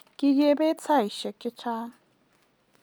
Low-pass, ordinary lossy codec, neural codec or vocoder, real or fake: none; none; none; real